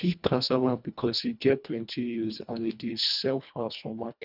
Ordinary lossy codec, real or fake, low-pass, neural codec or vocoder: none; fake; 5.4 kHz; codec, 24 kHz, 1.5 kbps, HILCodec